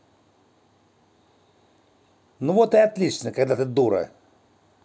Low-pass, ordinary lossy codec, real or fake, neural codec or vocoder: none; none; real; none